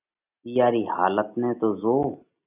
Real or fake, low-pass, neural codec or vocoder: real; 3.6 kHz; none